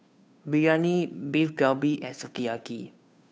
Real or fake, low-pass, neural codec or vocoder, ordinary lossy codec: fake; none; codec, 16 kHz, 2 kbps, FunCodec, trained on Chinese and English, 25 frames a second; none